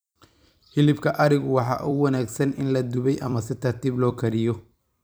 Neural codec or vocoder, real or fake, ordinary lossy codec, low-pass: vocoder, 44.1 kHz, 128 mel bands every 256 samples, BigVGAN v2; fake; none; none